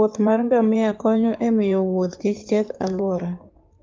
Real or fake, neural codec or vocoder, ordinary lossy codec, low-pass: fake; codec, 16 kHz in and 24 kHz out, 2.2 kbps, FireRedTTS-2 codec; Opus, 32 kbps; 7.2 kHz